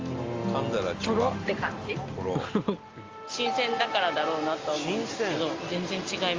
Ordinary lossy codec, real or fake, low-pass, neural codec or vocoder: Opus, 32 kbps; real; 7.2 kHz; none